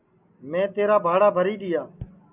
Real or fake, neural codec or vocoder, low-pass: real; none; 3.6 kHz